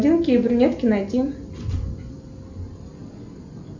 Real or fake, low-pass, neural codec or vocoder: real; 7.2 kHz; none